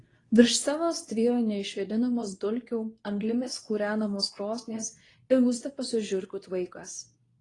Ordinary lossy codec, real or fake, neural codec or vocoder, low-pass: AAC, 32 kbps; fake; codec, 24 kHz, 0.9 kbps, WavTokenizer, medium speech release version 2; 10.8 kHz